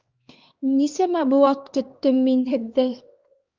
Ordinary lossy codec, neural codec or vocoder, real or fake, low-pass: Opus, 24 kbps; codec, 16 kHz, 2 kbps, X-Codec, HuBERT features, trained on LibriSpeech; fake; 7.2 kHz